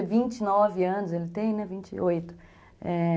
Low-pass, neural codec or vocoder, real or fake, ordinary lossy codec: none; none; real; none